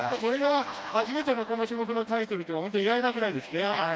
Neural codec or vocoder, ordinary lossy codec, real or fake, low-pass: codec, 16 kHz, 1 kbps, FreqCodec, smaller model; none; fake; none